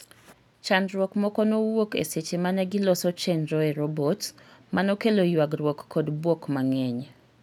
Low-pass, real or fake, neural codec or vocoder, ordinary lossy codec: 19.8 kHz; real; none; none